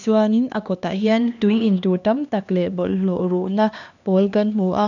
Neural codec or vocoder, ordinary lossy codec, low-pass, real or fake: codec, 16 kHz, 2 kbps, X-Codec, HuBERT features, trained on LibriSpeech; none; 7.2 kHz; fake